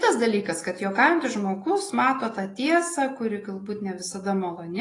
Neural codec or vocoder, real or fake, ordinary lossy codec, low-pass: none; real; AAC, 32 kbps; 10.8 kHz